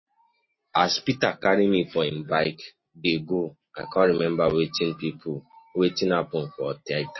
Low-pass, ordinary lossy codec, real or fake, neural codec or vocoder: 7.2 kHz; MP3, 24 kbps; real; none